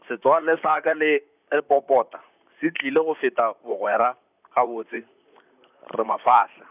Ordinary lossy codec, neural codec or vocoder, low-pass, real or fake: none; vocoder, 44.1 kHz, 128 mel bands, Pupu-Vocoder; 3.6 kHz; fake